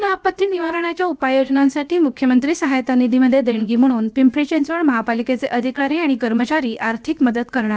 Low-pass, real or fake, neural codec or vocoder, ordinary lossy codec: none; fake; codec, 16 kHz, 0.7 kbps, FocalCodec; none